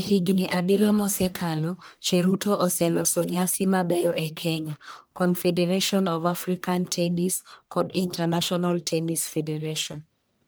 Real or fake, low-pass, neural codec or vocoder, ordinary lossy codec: fake; none; codec, 44.1 kHz, 1.7 kbps, Pupu-Codec; none